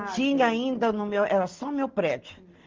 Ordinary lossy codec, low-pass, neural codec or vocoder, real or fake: Opus, 16 kbps; 7.2 kHz; none; real